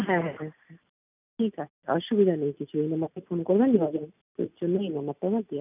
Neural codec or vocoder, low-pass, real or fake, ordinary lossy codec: none; 3.6 kHz; real; none